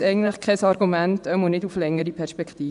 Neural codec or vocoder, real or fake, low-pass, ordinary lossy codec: vocoder, 24 kHz, 100 mel bands, Vocos; fake; 10.8 kHz; none